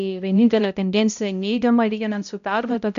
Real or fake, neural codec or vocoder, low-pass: fake; codec, 16 kHz, 0.5 kbps, X-Codec, HuBERT features, trained on balanced general audio; 7.2 kHz